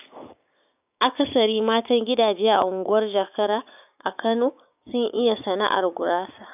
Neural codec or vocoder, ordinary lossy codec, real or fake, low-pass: codec, 16 kHz, 6 kbps, DAC; none; fake; 3.6 kHz